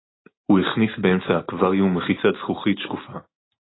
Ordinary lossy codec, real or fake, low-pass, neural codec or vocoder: AAC, 16 kbps; real; 7.2 kHz; none